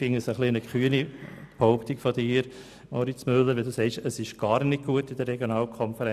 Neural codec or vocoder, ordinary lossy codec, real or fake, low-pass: none; none; real; 14.4 kHz